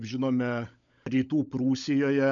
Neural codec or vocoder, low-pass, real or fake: codec, 16 kHz, 16 kbps, FunCodec, trained on LibriTTS, 50 frames a second; 7.2 kHz; fake